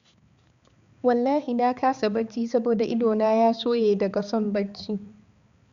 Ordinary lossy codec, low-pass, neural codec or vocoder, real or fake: Opus, 64 kbps; 7.2 kHz; codec, 16 kHz, 4 kbps, X-Codec, HuBERT features, trained on balanced general audio; fake